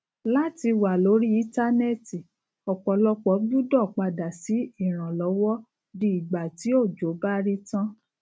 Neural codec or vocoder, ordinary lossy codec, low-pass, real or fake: none; none; none; real